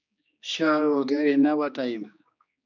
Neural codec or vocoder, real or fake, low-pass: codec, 16 kHz, 2 kbps, X-Codec, HuBERT features, trained on general audio; fake; 7.2 kHz